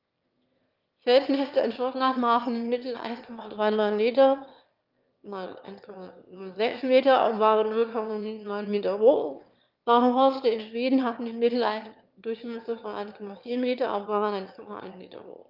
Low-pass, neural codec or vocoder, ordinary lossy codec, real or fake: 5.4 kHz; autoencoder, 22.05 kHz, a latent of 192 numbers a frame, VITS, trained on one speaker; Opus, 24 kbps; fake